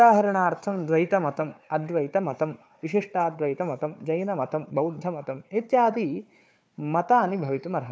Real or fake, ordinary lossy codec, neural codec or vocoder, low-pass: fake; none; codec, 16 kHz, 4 kbps, FunCodec, trained on Chinese and English, 50 frames a second; none